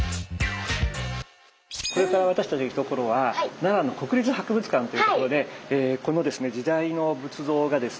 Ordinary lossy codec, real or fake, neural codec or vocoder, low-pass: none; real; none; none